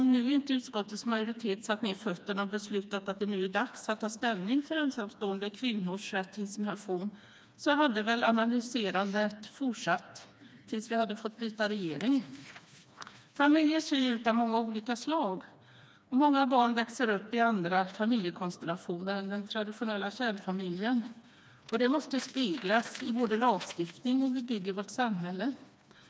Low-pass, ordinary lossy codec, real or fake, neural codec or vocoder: none; none; fake; codec, 16 kHz, 2 kbps, FreqCodec, smaller model